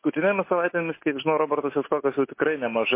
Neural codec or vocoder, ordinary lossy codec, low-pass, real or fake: none; MP3, 24 kbps; 3.6 kHz; real